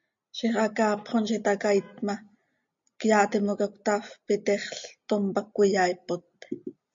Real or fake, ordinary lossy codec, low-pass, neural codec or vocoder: real; MP3, 48 kbps; 7.2 kHz; none